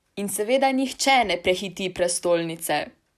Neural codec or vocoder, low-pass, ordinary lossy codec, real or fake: none; 14.4 kHz; none; real